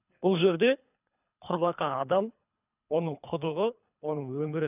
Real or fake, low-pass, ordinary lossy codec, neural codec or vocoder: fake; 3.6 kHz; none; codec, 24 kHz, 3 kbps, HILCodec